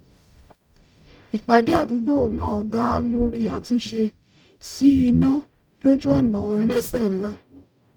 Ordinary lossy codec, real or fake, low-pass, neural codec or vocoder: none; fake; 19.8 kHz; codec, 44.1 kHz, 0.9 kbps, DAC